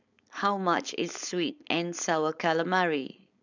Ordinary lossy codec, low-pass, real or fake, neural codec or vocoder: none; 7.2 kHz; fake; codec, 16 kHz, 4.8 kbps, FACodec